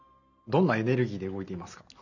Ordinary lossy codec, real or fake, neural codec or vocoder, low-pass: none; real; none; 7.2 kHz